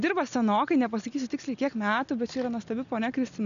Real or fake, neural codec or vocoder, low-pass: real; none; 7.2 kHz